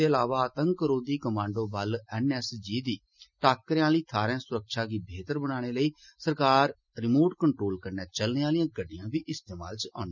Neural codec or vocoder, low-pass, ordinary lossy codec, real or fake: none; 7.2 kHz; none; real